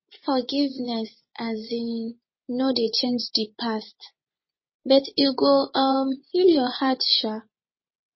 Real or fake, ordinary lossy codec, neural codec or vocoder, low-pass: fake; MP3, 24 kbps; vocoder, 24 kHz, 100 mel bands, Vocos; 7.2 kHz